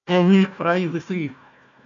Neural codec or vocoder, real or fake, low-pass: codec, 16 kHz, 1 kbps, FunCodec, trained on Chinese and English, 50 frames a second; fake; 7.2 kHz